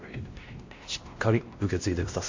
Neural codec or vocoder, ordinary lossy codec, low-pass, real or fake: codec, 16 kHz, 1 kbps, X-Codec, WavLM features, trained on Multilingual LibriSpeech; MP3, 48 kbps; 7.2 kHz; fake